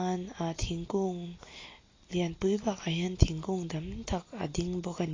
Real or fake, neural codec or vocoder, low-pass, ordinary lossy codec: real; none; 7.2 kHz; AAC, 32 kbps